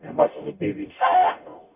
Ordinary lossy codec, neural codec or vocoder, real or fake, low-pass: none; codec, 44.1 kHz, 0.9 kbps, DAC; fake; 3.6 kHz